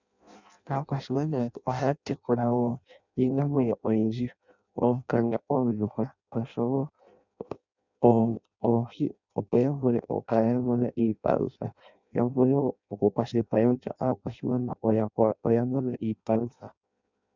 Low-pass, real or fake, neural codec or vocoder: 7.2 kHz; fake; codec, 16 kHz in and 24 kHz out, 0.6 kbps, FireRedTTS-2 codec